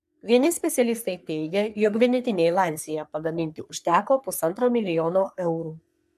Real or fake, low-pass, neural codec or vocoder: fake; 14.4 kHz; codec, 44.1 kHz, 3.4 kbps, Pupu-Codec